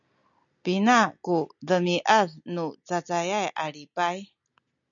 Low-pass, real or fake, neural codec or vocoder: 7.2 kHz; real; none